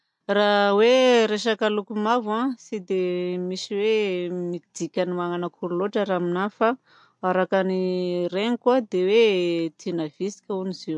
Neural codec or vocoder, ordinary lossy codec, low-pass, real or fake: none; MP3, 64 kbps; 10.8 kHz; real